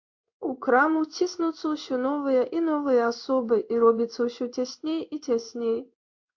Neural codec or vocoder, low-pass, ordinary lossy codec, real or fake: codec, 16 kHz in and 24 kHz out, 1 kbps, XY-Tokenizer; 7.2 kHz; AAC, 48 kbps; fake